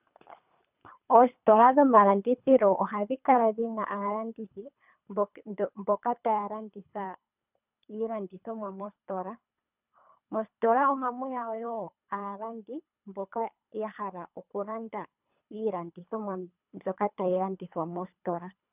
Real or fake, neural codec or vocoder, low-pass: fake; codec, 24 kHz, 3 kbps, HILCodec; 3.6 kHz